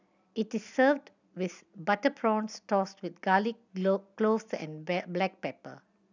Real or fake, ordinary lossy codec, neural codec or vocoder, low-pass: real; none; none; 7.2 kHz